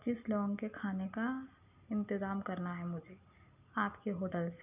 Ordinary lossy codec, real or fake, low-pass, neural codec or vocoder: none; real; 3.6 kHz; none